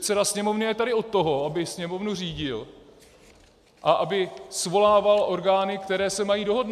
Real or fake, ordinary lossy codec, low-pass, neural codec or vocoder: real; MP3, 96 kbps; 14.4 kHz; none